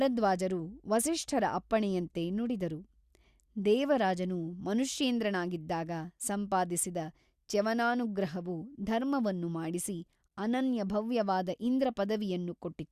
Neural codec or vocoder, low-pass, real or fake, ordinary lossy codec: none; 14.4 kHz; real; Opus, 64 kbps